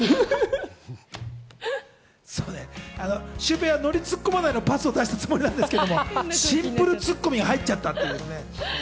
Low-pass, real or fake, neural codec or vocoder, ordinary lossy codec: none; real; none; none